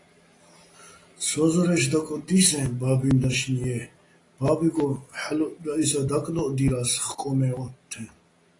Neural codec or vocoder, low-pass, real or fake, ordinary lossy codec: none; 10.8 kHz; real; AAC, 32 kbps